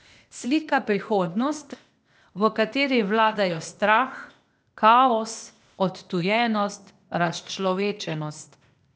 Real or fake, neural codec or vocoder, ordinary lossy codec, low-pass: fake; codec, 16 kHz, 0.8 kbps, ZipCodec; none; none